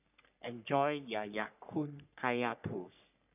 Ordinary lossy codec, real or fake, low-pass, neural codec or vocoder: none; fake; 3.6 kHz; codec, 44.1 kHz, 3.4 kbps, Pupu-Codec